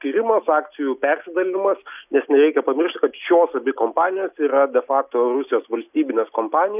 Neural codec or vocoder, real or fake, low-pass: none; real; 3.6 kHz